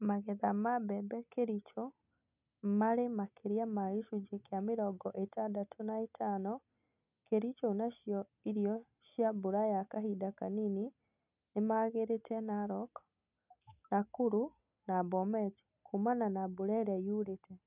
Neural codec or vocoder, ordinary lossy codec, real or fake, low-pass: none; none; real; 3.6 kHz